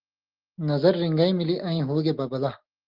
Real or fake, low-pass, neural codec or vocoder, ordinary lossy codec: real; 5.4 kHz; none; Opus, 16 kbps